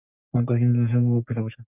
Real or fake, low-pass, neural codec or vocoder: fake; 3.6 kHz; codec, 44.1 kHz, 3.4 kbps, Pupu-Codec